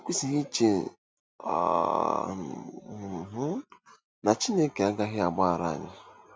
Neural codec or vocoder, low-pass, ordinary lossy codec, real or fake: none; none; none; real